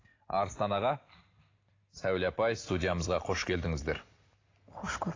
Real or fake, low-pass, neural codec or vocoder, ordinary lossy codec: real; 7.2 kHz; none; AAC, 32 kbps